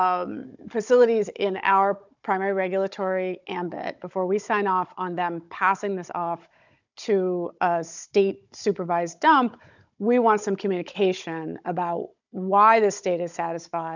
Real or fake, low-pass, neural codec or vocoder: fake; 7.2 kHz; codec, 16 kHz, 16 kbps, FunCodec, trained on Chinese and English, 50 frames a second